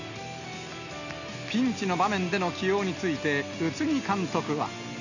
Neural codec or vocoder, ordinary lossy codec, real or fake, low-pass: none; none; real; 7.2 kHz